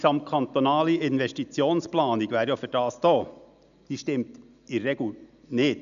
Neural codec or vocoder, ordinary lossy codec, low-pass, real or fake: none; none; 7.2 kHz; real